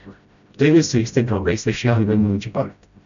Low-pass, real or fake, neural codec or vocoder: 7.2 kHz; fake; codec, 16 kHz, 0.5 kbps, FreqCodec, smaller model